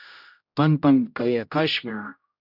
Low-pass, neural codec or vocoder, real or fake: 5.4 kHz; codec, 16 kHz, 0.5 kbps, X-Codec, HuBERT features, trained on general audio; fake